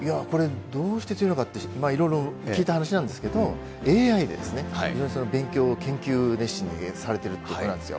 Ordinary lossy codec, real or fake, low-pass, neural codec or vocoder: none; real; none; none